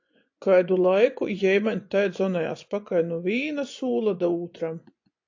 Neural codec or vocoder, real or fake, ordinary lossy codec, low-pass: vocoder, 24 kHz, 100 mel bands, Vocos; fake; AAC, 48 kbps; 7.2 kHz